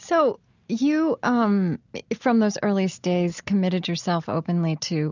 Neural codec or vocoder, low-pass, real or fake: none; 7.2 kHz; real